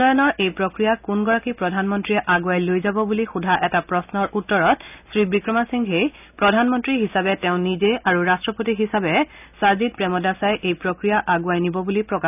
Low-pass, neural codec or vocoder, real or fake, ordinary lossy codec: 3.6 kHz; none; real; none